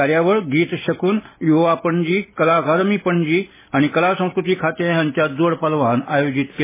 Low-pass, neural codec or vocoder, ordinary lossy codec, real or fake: 3.6 kHz; none; MP3, 16 kbps; real